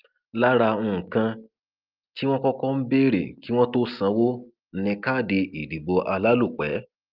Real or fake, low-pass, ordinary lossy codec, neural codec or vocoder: real; 5.4 kHz; Opus, 32 kbps; none